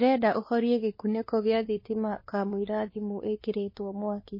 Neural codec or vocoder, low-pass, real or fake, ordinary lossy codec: codec, 16 kHz, 2 kbps, X-Codec, WavLM features, trained on Multilingual LibriSpeech; 5.4 kHz; fake; MP3, 24 kbps